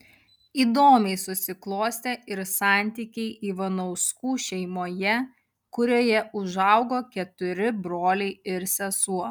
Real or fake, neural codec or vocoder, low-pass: real; none; 19.8 kHz